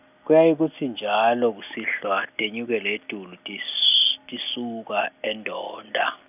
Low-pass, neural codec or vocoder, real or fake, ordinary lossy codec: 3.6 kHz; none; real; none